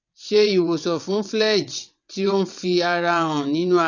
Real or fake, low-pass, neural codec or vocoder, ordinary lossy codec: fake; 7.2 kHz; vocoder, 22.05 kHz, 80 mel bands, WaveNeXt; none